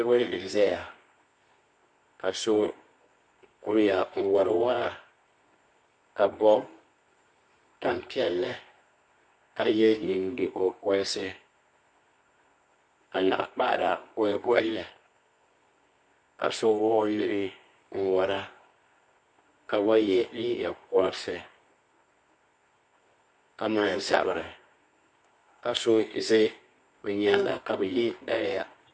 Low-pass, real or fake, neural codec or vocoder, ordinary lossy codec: 9.9 kHz; fake; codec, 24 kHz, 0.9 kbps, WavTokenizer, medium music audio release; MP3, 48 kbps